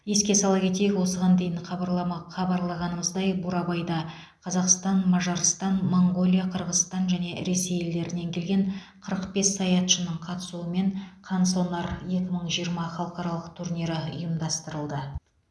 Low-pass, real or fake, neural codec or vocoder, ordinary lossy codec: none; real; none; none